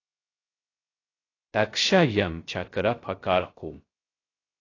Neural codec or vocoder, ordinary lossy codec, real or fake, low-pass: codec, 16 kHz, 0.3 kbps, FocalCodec; AAC, 32 kbps; fake; 7.2 kHz